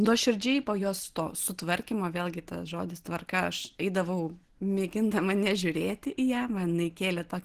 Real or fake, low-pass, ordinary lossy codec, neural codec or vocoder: real; 14.4 kHz; Opus, 16 kbps; none